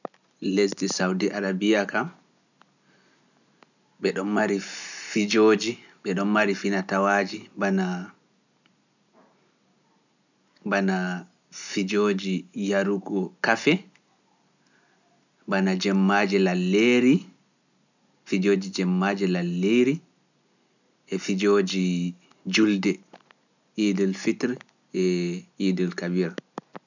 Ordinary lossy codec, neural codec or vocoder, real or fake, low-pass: none; none; real; 7.2 kHz